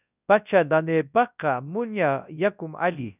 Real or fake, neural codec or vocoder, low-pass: fake; codec, 24 kHz, 0.9 kbps, WavTokenizer, large speech release; 3.6 kHz